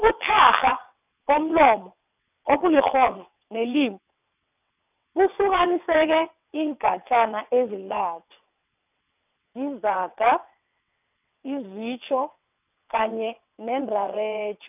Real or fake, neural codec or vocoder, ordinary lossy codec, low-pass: fake; vocoder, 22.05 kHz, 80 mel bands, WaveNeXt; none; 3.6 kHz